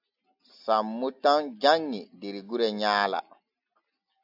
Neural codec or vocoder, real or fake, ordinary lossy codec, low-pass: none; real; AAC, 48 kbps; 5.4 kHz